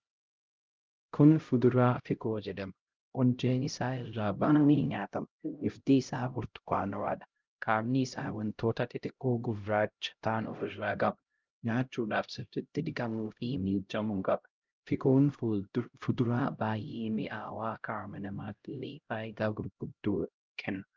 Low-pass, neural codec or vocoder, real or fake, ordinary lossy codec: 7.2 kHz; codec, 16 kHz, 0.5 kbps, X-Codec, HuBERT features, trained on LibriSpeech; fake; Opus, 24 kbps